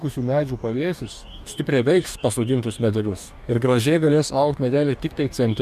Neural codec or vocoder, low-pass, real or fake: codec, 44.1 kHz, 2.6 kbps, DAC; 14.4 kHz; fake